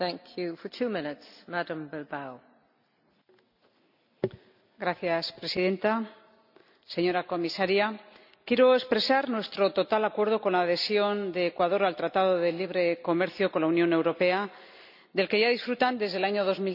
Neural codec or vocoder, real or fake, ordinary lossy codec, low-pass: none; real; none; 5.4 kHz